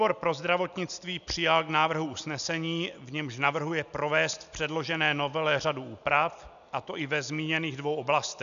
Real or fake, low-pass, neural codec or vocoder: real; 7.2 kHz; none